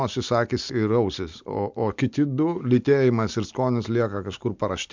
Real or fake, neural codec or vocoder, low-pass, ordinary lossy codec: real; none; 7.2 kHz; MP3, 64 kbps